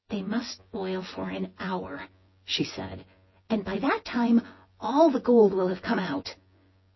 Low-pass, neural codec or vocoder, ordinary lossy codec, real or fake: 7.2 kHz; vocoder, 24 kHz, 100 mel bands, Vocos; MP3, 24 kbps; fake